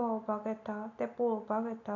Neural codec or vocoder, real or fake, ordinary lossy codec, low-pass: none; real; none; 7.2 kHz